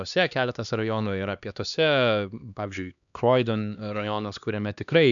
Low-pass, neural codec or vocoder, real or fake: 7.2 kHz; codec, 16 kHz, 2 kbps, X-Codec, HuBERT features, trained on LibriSpeech; fake